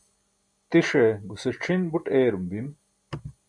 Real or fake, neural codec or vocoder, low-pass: real; none; 9.9 kHz